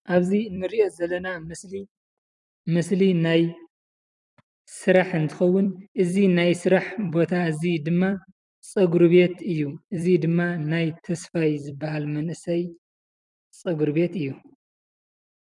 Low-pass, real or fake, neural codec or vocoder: 10.8 kHz; fake; vocoder, 44.1 kHz, 128 mel bands every 256 samples, BigVGAN v2